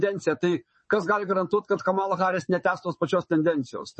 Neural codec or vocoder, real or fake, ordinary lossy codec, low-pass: vocoder, 22.05 kHz, 80 mel bands, WaveNeXt; fake; MP3, 32 kbps; 9.9 kHz